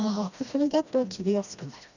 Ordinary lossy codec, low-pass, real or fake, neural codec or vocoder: none; 7.2 kHz; fake; codec, 16 kHz, 1 kbps, FreqCodec, smaller model